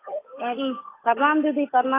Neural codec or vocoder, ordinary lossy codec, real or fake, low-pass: codec, 16 kHz, 8 kbps, FunCodec, trained on Chinese and English, 25 frames a second; AAC, 16 kbps; fake; 3.6 kHz